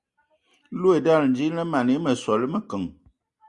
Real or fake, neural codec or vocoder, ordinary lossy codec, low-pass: real; none; Opus, 64 kbps; 10.8 kHz